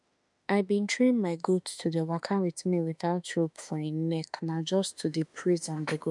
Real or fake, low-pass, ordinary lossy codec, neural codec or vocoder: fake; 10.8 kHz; none; autoencoder, 48 kHz, 32 numbers a frame, DAC-VAE, trained on Japanese speech